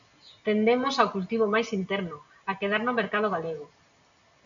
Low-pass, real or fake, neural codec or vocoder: 7.2 kHz; real; none